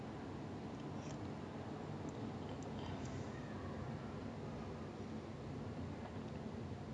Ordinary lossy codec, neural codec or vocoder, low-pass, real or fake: none; none; 9.9 kHz; real